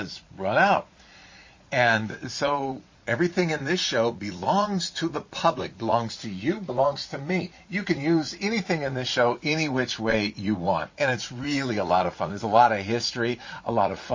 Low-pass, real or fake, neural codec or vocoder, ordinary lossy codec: 7.2 kHz; fake; vocoder, 44.1 kHz, 80 mel bands, Vocos; MP3, 32 kbps